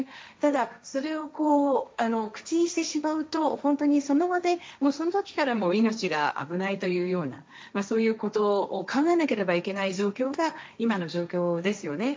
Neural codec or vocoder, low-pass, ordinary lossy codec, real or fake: codec, 16 kHz, 1.1 kbps, Voila-Tokenizer; none; none; fake